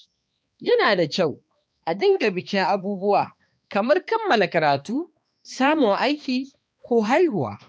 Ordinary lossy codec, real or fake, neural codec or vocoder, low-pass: none; fake; codec, 16 kHz, 2 kbps, X-Codec, HuBERT features, trained on balanced general audio; none